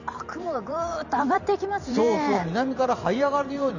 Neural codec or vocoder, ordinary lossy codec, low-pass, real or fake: vocoder, 44.1 kHz, 128 mel bands every 512 samples, BigVGAN v2; none; 7.2 kHz; fake